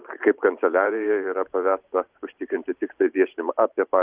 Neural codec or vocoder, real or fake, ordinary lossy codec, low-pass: vocoder, 44.1 kHz, 128 mel bands every 512 samples, BigVGAN v2; fake; Opus, 32 kbps; 3.6 kHz